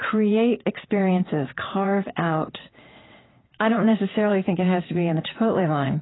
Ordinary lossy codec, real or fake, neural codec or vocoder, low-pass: AAC, 16 kbps; fake; vocoder, 22.05 kHz, 80 mel bands, WaveNeXt; 7.2 kHz